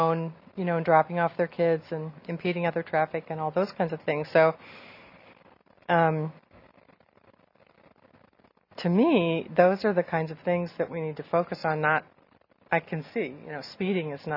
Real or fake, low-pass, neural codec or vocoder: real; 5.4 kHz; none